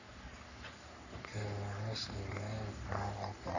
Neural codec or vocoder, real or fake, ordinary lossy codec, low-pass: codec, 44.1 kHz, 3.4 kbps, Pupu-Codec; fake; none; 7.2 kHz